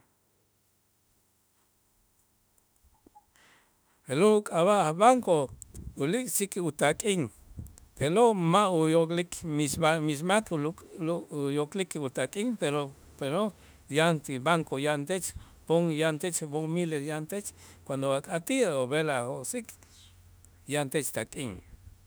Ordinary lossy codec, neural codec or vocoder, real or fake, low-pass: none; autoencoder, 48 kHz, 32 numbers a frame, DAC-VAE, trained on Japanese speech; fake; none